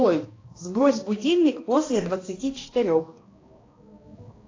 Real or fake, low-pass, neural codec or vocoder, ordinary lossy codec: fake; 7.2 kHz; codec, 16 kHz, 1 kbps, X-Codec, HuBERT features, trained on balanced general audio; AAC, 32 kbps